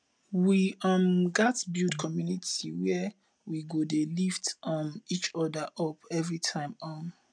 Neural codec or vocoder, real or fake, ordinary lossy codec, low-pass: none; real; none; 9.9 kHz